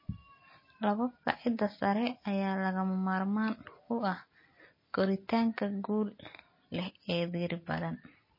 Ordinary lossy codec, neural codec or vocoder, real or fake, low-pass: MP3, 24 kbps; none; real; 5.4 kHz